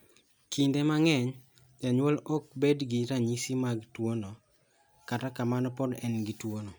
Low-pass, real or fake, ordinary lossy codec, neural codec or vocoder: none; real; none; none